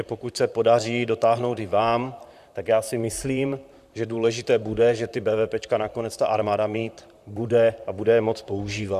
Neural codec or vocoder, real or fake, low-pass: vocoder, 44.1 kHz, 128 mel bands, Pupu-Vocoder; fake; 14.4 kHz